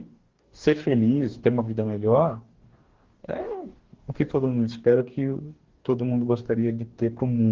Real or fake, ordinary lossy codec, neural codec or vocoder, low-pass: fake; Opus, 16 kbps; codec, 44.1 kHz, 2.6 kbps, DAC; 7.2 kHz